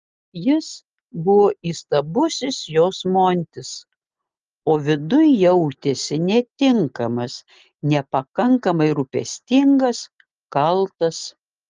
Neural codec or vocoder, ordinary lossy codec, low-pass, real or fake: none; Opus, 24 kbps; 7.2 kHz; real